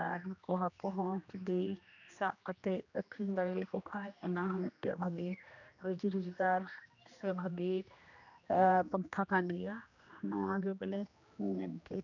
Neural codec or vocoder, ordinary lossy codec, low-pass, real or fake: codec, 16 kHz, 1 kbps, X-Codec, HuBERT features, trained on general audio; none; 7.2 kHz; fake